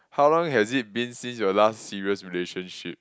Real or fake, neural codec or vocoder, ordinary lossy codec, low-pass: real; none; none; none